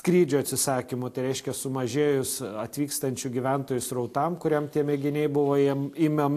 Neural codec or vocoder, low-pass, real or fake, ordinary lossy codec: none; 14.4 kHz; real; AAC, 64 kbps